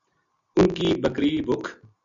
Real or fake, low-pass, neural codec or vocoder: real; 7.2 kHz; none